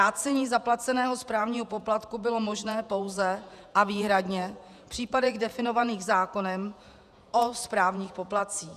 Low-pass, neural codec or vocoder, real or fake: 14.4 kHz; vocoder, 48 kHz, 128 mel bands, Vocos; fake